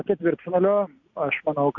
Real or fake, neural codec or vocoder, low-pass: real; none; 7.2 kHz